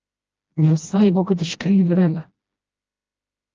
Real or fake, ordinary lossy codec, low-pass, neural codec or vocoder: fake; Opus, 24 kbps; 7.2 kHz; codec, 16 kHz, 1 kbps, FreqCodec, smaller model